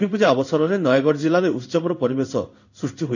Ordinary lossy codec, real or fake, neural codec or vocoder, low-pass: none; fake; codec, 16 kHz in and 24 kHz out, 1 kbps, XY-Tokenizer; 7.2 kHz